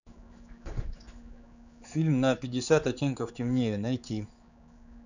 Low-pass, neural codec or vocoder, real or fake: 7.2 kHz; codec, 16 kHz, 4 kbps, X-Codec, HuBERT features, trained on general audio; fake